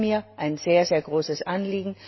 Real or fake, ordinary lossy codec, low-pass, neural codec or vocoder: real; MP3, 24 kbps; 7.2 kHz; none